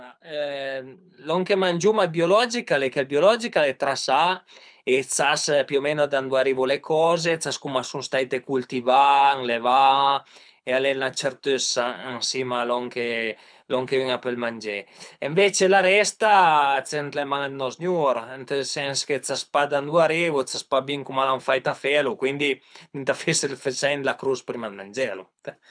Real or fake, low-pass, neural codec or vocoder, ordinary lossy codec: fake; 9.9 kHz; codec, 24 kHz, 6 kbps, HILCodec; none